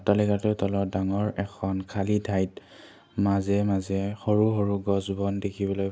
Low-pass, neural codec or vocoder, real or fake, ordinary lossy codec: none; none; real; none